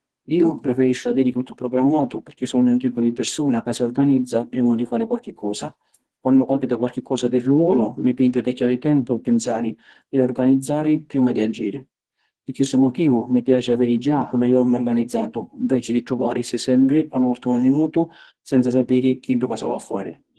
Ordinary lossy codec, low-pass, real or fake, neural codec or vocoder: Opus, 16 kbps; 10.8 kHz; fake; codec, 24 kHz, 0.9 kbps, WavTokenizer, medium music audio release